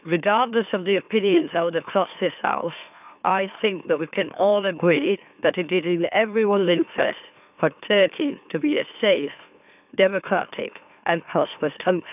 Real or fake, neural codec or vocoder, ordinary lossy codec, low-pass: fake; autoencoder, 44.1 kHz, a latent of 192 numbers a frame, MeloTTS; none; 3.6 kHz